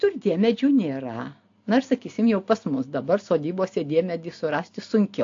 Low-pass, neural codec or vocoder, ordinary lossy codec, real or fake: 7.2 kHz; none; AAC, 48 kbps; real